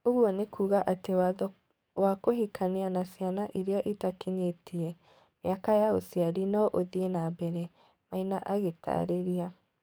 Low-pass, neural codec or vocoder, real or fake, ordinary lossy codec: none; codec, 44.1 kHz, 7.8 kbps, DAC; fake; none